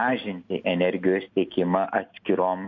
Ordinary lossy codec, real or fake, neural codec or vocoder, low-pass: MP3, 48 kbps; real; none; 7.2 kHz